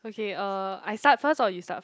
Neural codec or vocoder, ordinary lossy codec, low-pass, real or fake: none; none; none; real